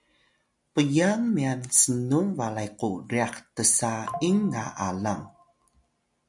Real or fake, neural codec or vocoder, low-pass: real; none; 10.8 kHz